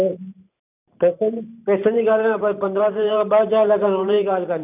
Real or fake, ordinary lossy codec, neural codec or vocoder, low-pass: fake; none; vocoder, 44.1 kHz, 128 mel bands every 512 samples, BigVGAN v2; 3.6 kHz